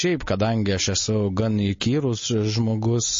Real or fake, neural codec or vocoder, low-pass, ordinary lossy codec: real; none; 7.2 kHz; MP3, 32 kbps